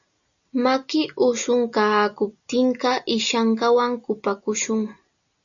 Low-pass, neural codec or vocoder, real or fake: 7.2 kHz; none; real